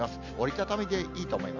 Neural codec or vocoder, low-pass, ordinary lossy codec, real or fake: none; 7.2 kHz; none; real